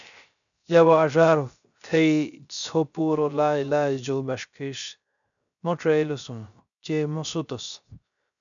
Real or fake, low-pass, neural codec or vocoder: fake; 7.2 kHz; codec, 16 kHz, 0.3 kbps, FocalCodec